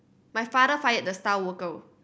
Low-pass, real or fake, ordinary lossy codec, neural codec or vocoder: none; real; none; none